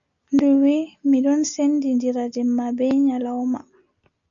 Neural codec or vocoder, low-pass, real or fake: none; 7.2 kHz; real